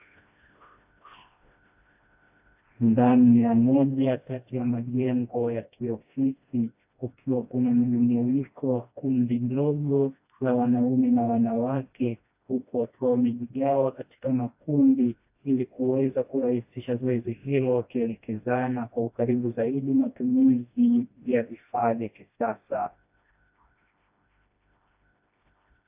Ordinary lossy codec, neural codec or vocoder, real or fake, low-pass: AAC, 32 kbps; codec, 16 kHz, 1 kbps, FreqCodec, smaller model; fake; 3.6 kHz